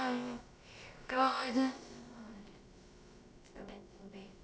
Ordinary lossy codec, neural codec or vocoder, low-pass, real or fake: none; codec, 16 kHz, about 1 kbps, DyCAST, with the encoder's durations; none; fake